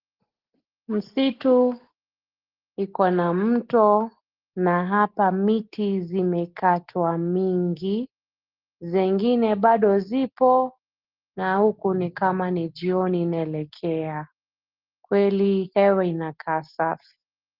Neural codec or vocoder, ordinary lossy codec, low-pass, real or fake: none; Opus, 16 kbps; 5.4 kHz; real